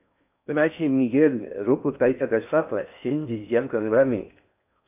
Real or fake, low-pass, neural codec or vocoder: fake; 3.6 kHz; codec, 16 kHz in and 24 kHz out, 0.6 kbps, FocalCodec, streaming, 4096 codes